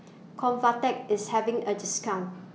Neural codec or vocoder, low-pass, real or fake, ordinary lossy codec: none; none; real; none